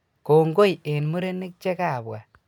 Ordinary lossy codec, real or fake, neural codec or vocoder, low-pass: none; real; none; 19.8 kHz